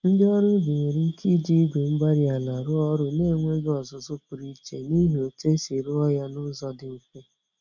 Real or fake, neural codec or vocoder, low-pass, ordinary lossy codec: real; none; 7.2 kHz; none